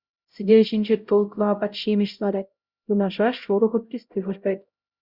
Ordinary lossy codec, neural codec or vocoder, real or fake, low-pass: Opus, 64 kbps; codec, 16 kHz, 0.5 kbps, X-Codec, HuBERT features, trained on LibriSpeech; fake; 5.4 kHz